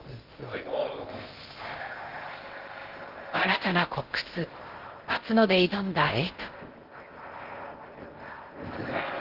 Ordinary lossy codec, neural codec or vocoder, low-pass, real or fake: Opus, 16 kbps; codec, 16 kHz in and 24 kHz out, 0.6 kbps, FocalCodec, streaming, 2048 codes; 5.4 kHz; fake